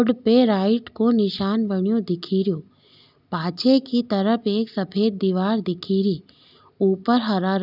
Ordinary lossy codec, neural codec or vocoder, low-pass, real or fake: none; none; 5.4 kHz; real